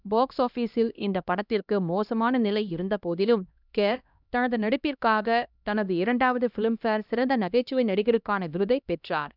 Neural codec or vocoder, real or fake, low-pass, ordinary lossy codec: codec, 16 kHz, 1 kbps, X-Codec, HuBERT features, trained on LibriSpeech; fake; 5.4 kHz; none